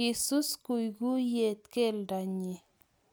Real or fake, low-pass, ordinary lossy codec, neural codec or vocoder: real; none; none; none